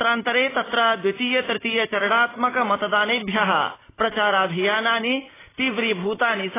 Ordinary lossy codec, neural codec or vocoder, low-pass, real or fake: AAC, 16 kbps; none; 3.6 kHz; real